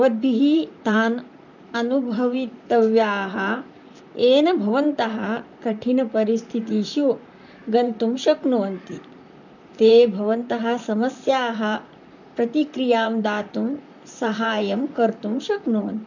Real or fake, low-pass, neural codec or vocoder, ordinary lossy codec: fake; 7.2 kHz; vocoder, 44.1 kHz, 128 mel bands, Pupu-Vocoder; none